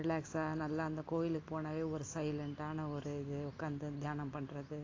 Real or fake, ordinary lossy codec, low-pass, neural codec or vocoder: real; AAC, 32 kbps; 7.2 kHz; none